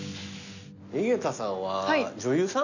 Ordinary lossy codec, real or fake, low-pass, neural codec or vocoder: none; real; 7.2 kHz; none